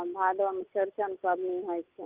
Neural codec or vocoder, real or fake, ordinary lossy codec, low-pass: none; real; Opus, 24 kbps; 3.6 kHz